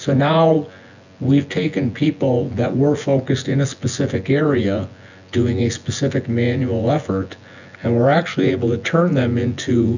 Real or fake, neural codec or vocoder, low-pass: fake; vocoder, 24 kHz, 100 mel bands, Vocos; 7.2 kHz